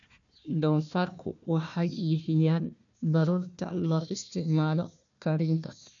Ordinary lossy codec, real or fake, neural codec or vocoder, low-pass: none; fake; codec, 16 kHz, 1 kbps, FunCodec, trained on Chinese and English, 50 frames a second; 7.2 kHz